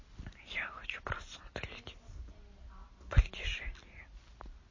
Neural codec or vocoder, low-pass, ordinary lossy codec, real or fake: none; 7.2 kHz; MP3, 32 kbps; real